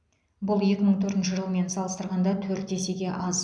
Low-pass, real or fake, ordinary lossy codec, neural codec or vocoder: 9.9 kHz; real; none; none